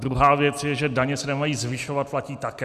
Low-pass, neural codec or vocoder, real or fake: 14.4 kHz; none; real